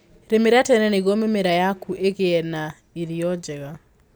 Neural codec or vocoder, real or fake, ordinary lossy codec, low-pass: none; real; none; none